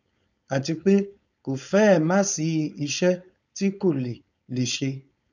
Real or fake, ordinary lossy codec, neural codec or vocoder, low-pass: fake; none; codec, 16 kHz, 4.8 kbps, FACodec; 7.2 kHz